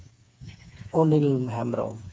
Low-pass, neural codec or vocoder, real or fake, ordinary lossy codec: none; codec, 16 kHz, 4 kbps, FreqCodec, smaller model; fake; none